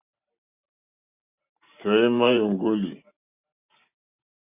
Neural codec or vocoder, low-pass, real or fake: vocoder, 44.1 kHz, 128 mel bands every 512 samples, BigVGAN v2; 3.6 kHz; fake